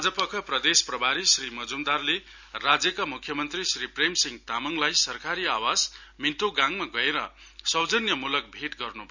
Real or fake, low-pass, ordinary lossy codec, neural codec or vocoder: real; 7.2 kHz; none; none